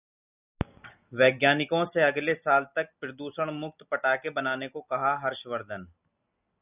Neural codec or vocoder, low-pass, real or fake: none; 3.6 kHz; real